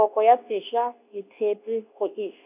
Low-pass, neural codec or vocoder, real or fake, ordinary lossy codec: 3.6 kHz; codec, 24 kHz, 0.9 kbps, WavTokenizer, medium speech release version 2; fake; none